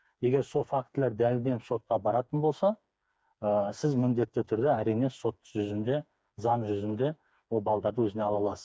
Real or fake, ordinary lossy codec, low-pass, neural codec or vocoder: fake; none; none; codec, 16 kHz, 4 kbps, FreqCodec, smaller model